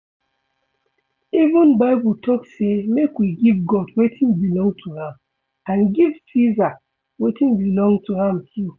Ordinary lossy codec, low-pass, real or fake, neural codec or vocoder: none; 7.2 kHz; real; none